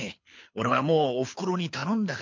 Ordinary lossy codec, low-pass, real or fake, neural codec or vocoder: MP3, 48 kbps; 7.2 kHz; fake; codec, 24 kHz, 6 kbps, HILCodec